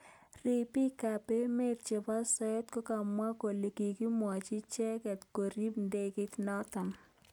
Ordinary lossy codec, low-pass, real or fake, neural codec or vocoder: none; none; real; none